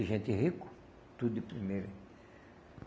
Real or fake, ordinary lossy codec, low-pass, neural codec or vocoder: real; none; none; none